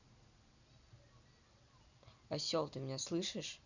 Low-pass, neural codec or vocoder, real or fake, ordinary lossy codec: 7.2 kHz; none; real; none